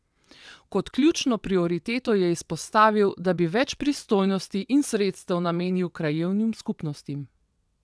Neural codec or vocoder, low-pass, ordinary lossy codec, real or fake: vocoder, 22.05 kHz, 80 mel bands, WaveNeXt; none; none; fake